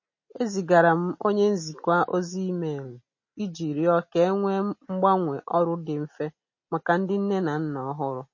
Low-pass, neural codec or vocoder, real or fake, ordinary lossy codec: 7.2 kHz; none; real; MP3, 32 kbps